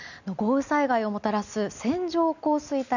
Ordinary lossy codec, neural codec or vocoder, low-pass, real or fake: none; none; 7.2 kHz; real